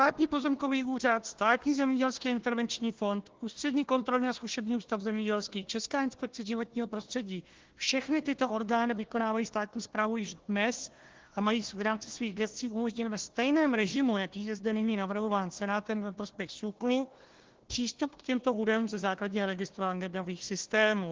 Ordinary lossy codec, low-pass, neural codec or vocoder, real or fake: Opus, 16 kbps; 7.2 kHz; codec, 16 kHz, 1 kbps, FunCodec, trained on Chinese and English, 50 frames a second; fake